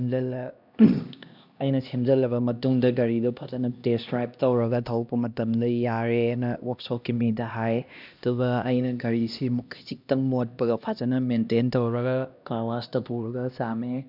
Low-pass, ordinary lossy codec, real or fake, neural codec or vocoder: 5.4 kHz; none; fake; codec, 16 kHz, 1 kbps, X-Codec, HuBERT features, trained on LibriSpeech